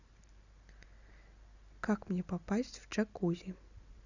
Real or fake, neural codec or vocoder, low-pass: real; none; 7.2 kHz